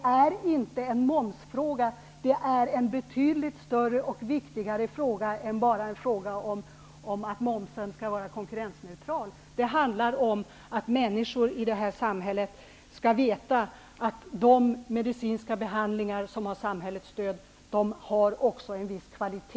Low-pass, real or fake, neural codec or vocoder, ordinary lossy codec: none; real; none; none